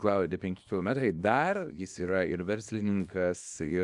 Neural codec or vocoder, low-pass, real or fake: codec, 24 kHz, 0.9 kbps, WavTokenizer, small release; 10.8 kHz; fake